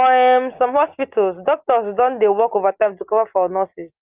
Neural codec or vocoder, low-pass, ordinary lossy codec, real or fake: none; 3.6 kHz; Opus, 24 kbps; real